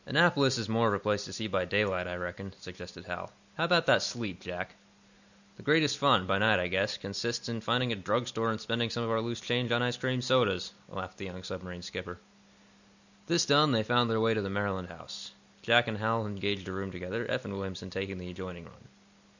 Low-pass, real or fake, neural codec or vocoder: 7.2 kHz; real; none